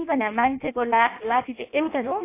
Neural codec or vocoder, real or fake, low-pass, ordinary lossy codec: codec, 16 kHz in and 24 kHz out, 0.6 kbps, FireRedTTS-2 codec; fake; 3.6 kHz; AAC, 24 kbps